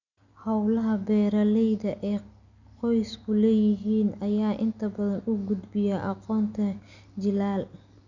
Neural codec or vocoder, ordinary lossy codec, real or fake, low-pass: none; none; real; 7.2 kHz